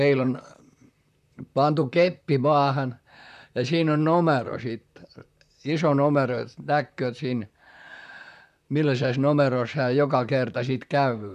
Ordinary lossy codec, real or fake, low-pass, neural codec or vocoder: none; fake; 14.4 kHz; vocoder, 44.1 kHz, 128 mel bands, Pupu-Vocoder